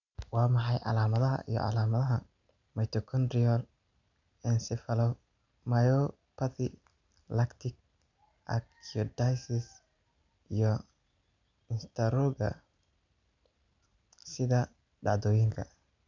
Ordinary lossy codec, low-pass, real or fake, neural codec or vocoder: none; 7.2 kHz; real; none